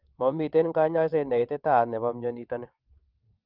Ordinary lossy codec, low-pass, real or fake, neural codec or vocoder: Opus, 32 kbps; 5.4 kHz; fake; vocoder, 22.05 kHz, 80 mel bands, WaveNeXt